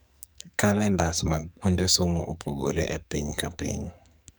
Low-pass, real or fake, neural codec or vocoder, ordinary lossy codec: none; fake; codec, 44.1 kHz, 2.6 kbps, SNAC; none